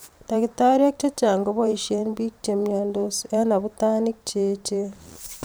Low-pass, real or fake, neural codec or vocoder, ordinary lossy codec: none; fake; vocoder, 44.1 kHz, 128 mel bands every 512 samples, BigVGAN v2; none